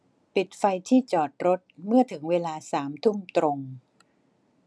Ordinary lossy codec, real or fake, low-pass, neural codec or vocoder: none; real; none; none